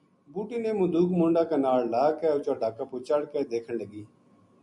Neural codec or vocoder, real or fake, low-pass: none; real; 10.8 kHz